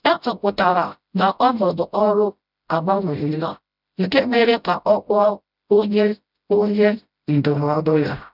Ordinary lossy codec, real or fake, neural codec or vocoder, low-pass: none; fake; codec, 16 kHz, 0.5 kbps, FreqCodec, smaller model; 5.4 kHz